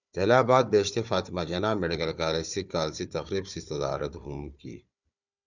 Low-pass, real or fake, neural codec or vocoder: 7.2 kHz; fake; codec, 16 kHz, 4 kbps, FunCodec, trained on Chinese and English, 50 frames a second